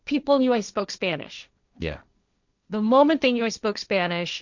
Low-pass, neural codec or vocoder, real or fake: 7.2 kHz; codec, 16 kHz, 1.1 kbps, Voila-Tokenizer; fake